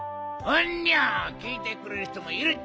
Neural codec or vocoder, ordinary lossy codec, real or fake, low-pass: none; none; real; none